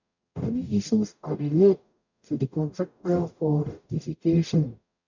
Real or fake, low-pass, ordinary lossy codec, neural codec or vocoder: fake; 7.2 kHz; none; codec, 44.1 kHz, 0.9 kbps, DAC